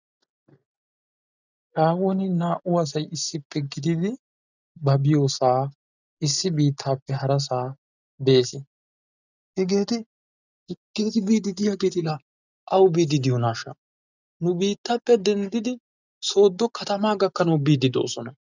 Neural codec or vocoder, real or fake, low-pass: none; real; 7.2 kHz